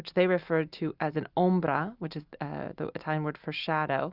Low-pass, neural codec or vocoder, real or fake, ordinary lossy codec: 5.4 kHz; none; real; Opus, 64 kbps